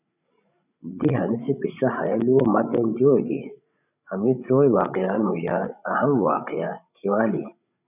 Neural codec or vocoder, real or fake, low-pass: codec, 16 kHz, 16 kbps, FreqCodec, larger model; fake; 3.6 kHz